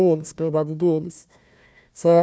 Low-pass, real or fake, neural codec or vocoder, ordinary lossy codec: none; fake; codec, 16 kHz, 1 kbps, FunCodec, trained on Chinese and English, 50 frames a second; none